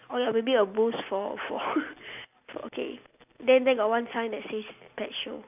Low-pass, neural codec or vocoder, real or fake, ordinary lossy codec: 3.6 kHz; none; real; none